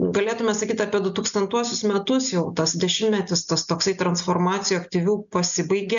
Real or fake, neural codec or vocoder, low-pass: real; none; 7.2 kHz